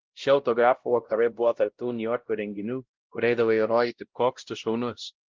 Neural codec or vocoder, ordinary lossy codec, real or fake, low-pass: codec, 16 kHz, 0.5 kbps, X-Codec, WavLM features, trained on Multilingual LibriSpeech; Opus, 16 kbps; fake; 7.2 kHz